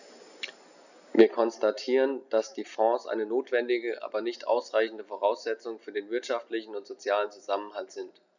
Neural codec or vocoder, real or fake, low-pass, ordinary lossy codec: none; real; 7.2 kHz; none